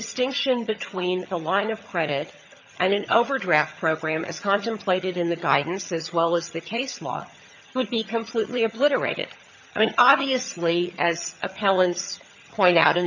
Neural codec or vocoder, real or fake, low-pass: vocoder, 22.05 kHz, 80 mel bands, HiFi-GAN; fake; 7.2 kHz